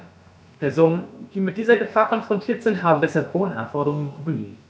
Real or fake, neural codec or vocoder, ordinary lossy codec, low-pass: fake; codec, 16 kHz, about 1 kbps, DyCAST, with the encoder's durations; none; none